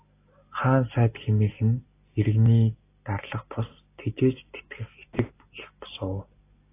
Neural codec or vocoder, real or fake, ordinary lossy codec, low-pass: none; real; AAC, 24 kbps; 3.6 kHz